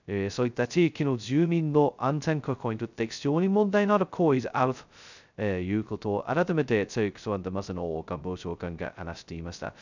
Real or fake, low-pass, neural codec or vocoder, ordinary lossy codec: fake; 7.2 kHz; codec, 16 kHz, 0.2 kbps, FocalCodec; none